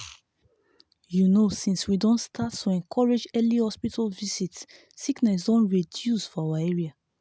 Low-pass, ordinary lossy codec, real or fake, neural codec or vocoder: none; none; real; none